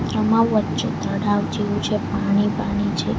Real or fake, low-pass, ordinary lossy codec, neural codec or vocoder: real; none; none; none